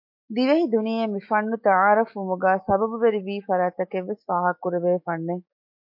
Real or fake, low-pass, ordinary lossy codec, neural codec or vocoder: fake; 5.4 kHz; MP3, 32 kbps; autoencoder, 48 kHz, 128 numbers a frame, DAC-VAE, trained on Japanese speech